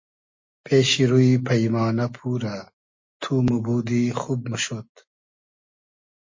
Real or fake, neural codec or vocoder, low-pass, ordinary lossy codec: real; none; 7.2 kHz; MP3, 32 kbps